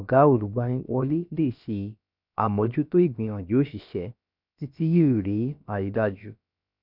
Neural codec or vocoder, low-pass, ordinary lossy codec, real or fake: codec, 16 kHz, about 1 kbps, DyCAST, with the encoder's durations; 5.4 kHz; none; fake